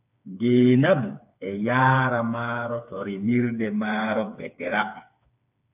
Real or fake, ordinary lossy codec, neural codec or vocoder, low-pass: fake; AAC, 32 kbps; codec, 16 kHz, 4 kbps, FreqCodec, smaller model; 3.6 kHz